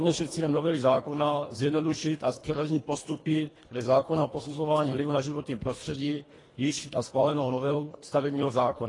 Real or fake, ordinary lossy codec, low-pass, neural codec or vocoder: fake; AAC, 32 kbps; 10.8 kHz; codec, 24 kHz, 1.5 kbps, HILCodec